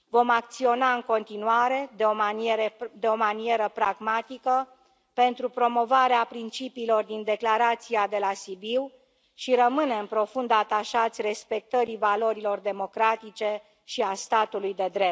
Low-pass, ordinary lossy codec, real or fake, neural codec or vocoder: none; none; real; none